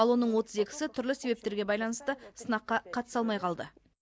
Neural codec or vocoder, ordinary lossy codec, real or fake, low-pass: none; none; real; none